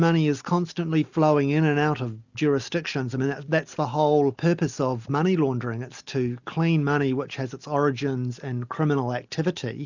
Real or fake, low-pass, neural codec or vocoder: real; 7.2 kHz; none